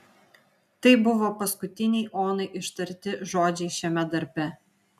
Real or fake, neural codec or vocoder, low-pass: real; none; 14.4 kHz